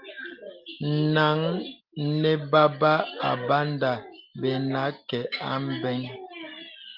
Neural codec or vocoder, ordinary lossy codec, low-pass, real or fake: none; Opus, 24 kbps; 5.4 kHz; real